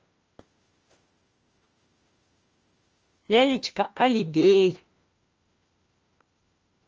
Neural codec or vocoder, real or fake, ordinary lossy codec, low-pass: codec, 16 kHz, 1 kbps, FunCodec, trained on LibriTTS, 50 frames a second; fake; Opus, 24 kbps; 7.2 kHz